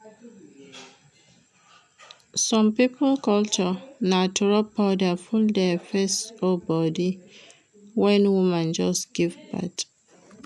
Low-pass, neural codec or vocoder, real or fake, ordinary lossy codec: none; none; real; none